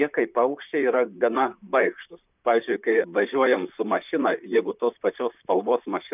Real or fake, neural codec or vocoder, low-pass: fake; vocoder, 44.1 kHz, 128 mel bands, Pupu-Vocoder; 3.6 kHz